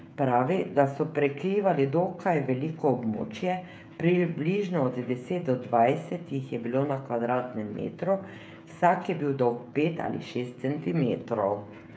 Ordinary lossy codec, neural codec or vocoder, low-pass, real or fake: none; codec, 16 kHz, 16 kbps, FreqCodec, smaller model; none; fake